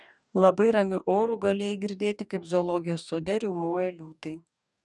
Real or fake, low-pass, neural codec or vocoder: fake; 10.8 kHz; codec, 44.1 kHz, 2.6 kbps, DAC